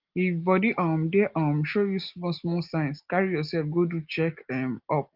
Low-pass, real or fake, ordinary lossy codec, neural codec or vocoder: 5.4 kHz; real; Opus, 32 kbps; none